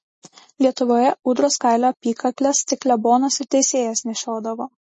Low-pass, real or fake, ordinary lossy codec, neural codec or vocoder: 10.8 kHz; real; MP3, 32 kbps; none